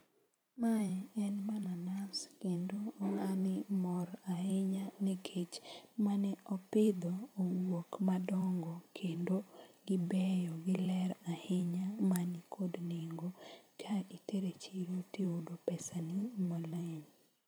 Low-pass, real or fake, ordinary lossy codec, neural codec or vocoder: none; fake; none; vocoder, 44.1 kHz, 128 mel bands every 512 samples, BigVGAN v2